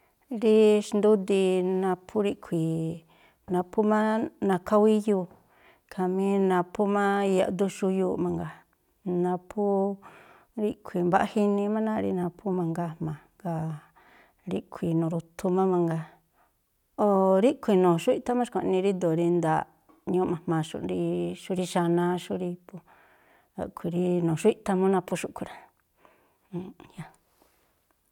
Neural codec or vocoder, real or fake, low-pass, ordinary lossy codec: none; real; 19.8 kHz; none